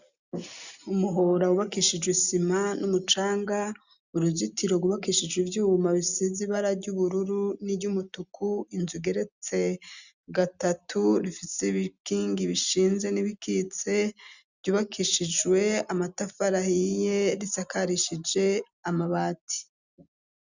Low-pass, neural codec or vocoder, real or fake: 7.2 kHz; none; real